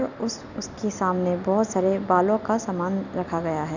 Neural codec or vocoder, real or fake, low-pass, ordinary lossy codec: none; real; 7.2 kHz; none